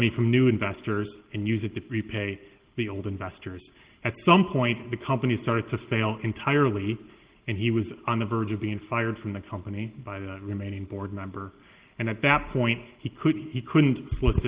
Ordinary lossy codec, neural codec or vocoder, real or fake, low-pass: Opus, 16 kbps; none; real; 3.6 kHz